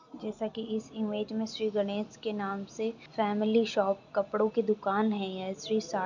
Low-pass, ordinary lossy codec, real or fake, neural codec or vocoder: 7.2 kHz; none; real; none